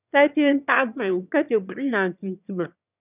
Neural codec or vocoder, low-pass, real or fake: autoencoder, 22.05 kHz, a latent of 192 numbers a frame, VITS, trained on one speaker; 3.6 kHz; fake